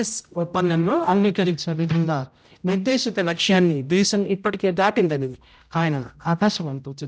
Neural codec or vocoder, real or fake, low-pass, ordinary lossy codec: codec, 16 kHz, 0.5 kbps, X-Codec, HuBERT features, trained on general audio; fake; none; none